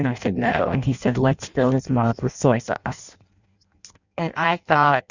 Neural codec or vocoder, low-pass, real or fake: codec, 16 kHz in and 24 kHz out, 0.6 kbps, FireRedTTS-2 codec; 7.2 kHz; fake